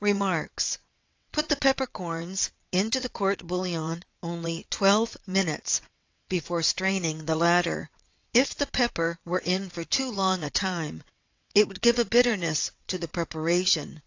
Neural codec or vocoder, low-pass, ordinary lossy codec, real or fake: vocoder, 22.05 kHz, 80 mel bands, WaveNeXt; 7.2 kHz; AAC, 48 kbps; fake